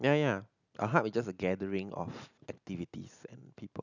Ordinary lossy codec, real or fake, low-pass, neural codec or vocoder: none; real; 7.2 kHz; none